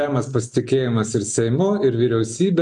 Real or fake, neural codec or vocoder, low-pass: real; none; 10.8 kHz